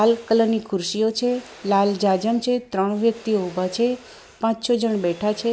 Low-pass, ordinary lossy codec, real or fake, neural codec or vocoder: none; none; real; none